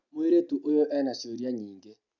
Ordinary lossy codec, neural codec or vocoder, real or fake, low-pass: none; none; real; 7.2 kHz